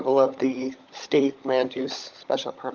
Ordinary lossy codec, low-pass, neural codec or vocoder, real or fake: Opus, 24 kbps; 7.2 kHz; codec, 16 kHz, 16 kbps, FunCodec, trained on LibriTTS, 50 frames a second; fake